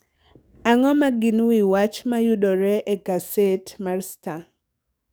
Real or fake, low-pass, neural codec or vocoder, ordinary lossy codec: fake; none; codec, 44.1 kHz, 7.8 kbps, DAC; none